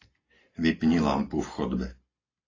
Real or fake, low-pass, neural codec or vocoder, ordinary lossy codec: real; 7.2 kHz; none; AAC, 32 kbps